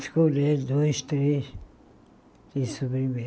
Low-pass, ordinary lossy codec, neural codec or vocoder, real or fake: none; none; none; real